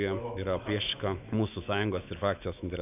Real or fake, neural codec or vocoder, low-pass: fake; autoencoder, 48 kHz, 128 numbers a frame, DAC-VAE, trained on Japanese speech; 3.6 kHz